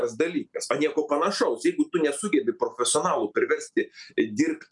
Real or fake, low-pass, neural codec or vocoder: real; 10.8 kHz; none